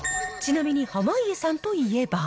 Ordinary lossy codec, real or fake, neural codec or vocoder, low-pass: none; real; none; none